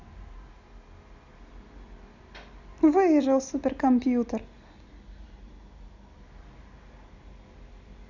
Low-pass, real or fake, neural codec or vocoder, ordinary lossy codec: 7.2 kHz; real; none; none